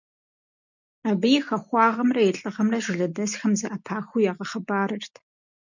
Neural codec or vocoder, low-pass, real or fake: none; 7.2 kHz; real